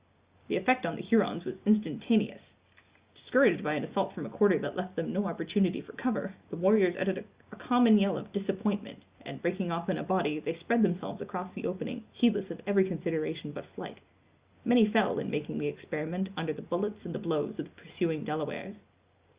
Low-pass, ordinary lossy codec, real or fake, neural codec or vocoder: 3.6 kHz; Opus, 64 kbps; real; none